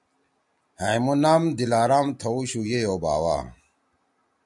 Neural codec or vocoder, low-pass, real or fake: none; 10.8 kHz; real